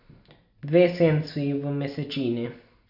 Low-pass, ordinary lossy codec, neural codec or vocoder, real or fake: 5.4 kHz; none; none; real